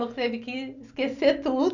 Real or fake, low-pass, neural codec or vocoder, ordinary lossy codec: real; 7.2 kHz; none; none